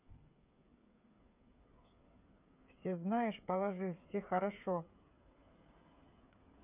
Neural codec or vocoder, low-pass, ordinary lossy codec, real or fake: codec, 16 kHz, 8 kbps, FreqCodec, smaller model; 3.6 kHz; none; fake